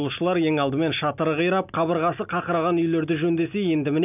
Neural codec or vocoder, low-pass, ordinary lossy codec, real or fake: none; 3.6 kHz; none; real